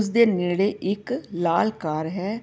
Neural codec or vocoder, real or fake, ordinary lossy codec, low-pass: none; real; none; none